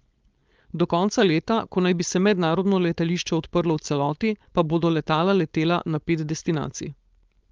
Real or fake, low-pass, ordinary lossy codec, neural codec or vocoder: fake; 7.2 kHz; Opus, 32 kbps; codec, 16 kHz, 4.8 kbps, FACodec